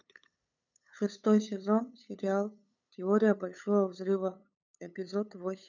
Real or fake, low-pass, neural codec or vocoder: fake; 7.2 kHz; codec, 16 kHz, 8 kbps, FunCodec, trained on LibriTTS, 25 frames a second